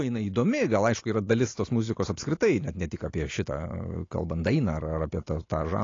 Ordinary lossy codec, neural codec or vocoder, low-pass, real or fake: AAC, 32 kbps; none; 7.2 kHz; real